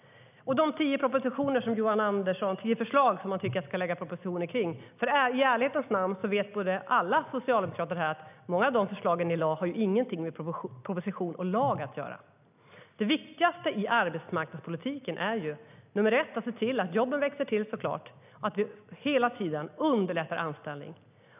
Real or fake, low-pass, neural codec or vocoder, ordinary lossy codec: real; 3.6 kHz; none; none